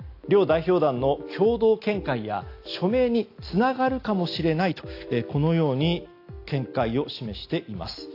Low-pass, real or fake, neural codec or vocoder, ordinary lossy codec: 5.4 kHz; real; none; AAC, 32 kbps